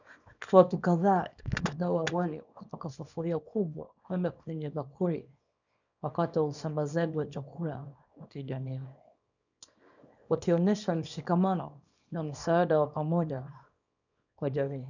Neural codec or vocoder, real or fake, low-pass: codec, 24 kHz, 0.9 kbps, WavTokenizer, small release; fake; 7.2 kHz